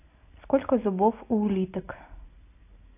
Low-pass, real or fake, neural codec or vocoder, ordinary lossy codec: 3.6 kHz; real; none; AAC, 24 kbps